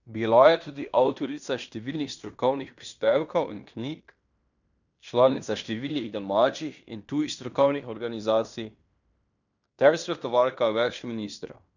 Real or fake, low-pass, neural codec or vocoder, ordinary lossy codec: fake; 7.2 kHz; codec, 16 kHz in and 24 kHz out, 0.9 kbps, LongCat-Audio-Codec, fine tuned four codebook decoder; none